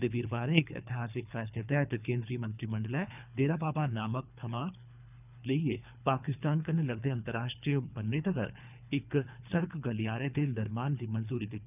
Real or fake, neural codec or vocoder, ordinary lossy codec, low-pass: fake; codec, 24 kHz, 3 kbps, HILCodec; none; 3.6 kHz